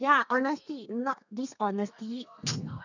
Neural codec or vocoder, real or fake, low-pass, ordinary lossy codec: codec, 32 kHz, 1.9 kbps, SNAC; fake; 7.2 kHz; none